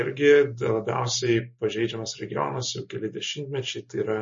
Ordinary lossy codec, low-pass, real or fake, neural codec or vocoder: MP3, 32 kbps; 7.2 kHz; real; none